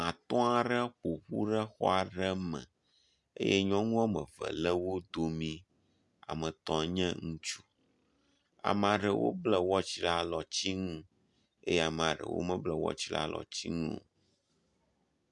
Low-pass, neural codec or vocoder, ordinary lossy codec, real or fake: 10.8 kHz; none; MP3, 96 kbps; real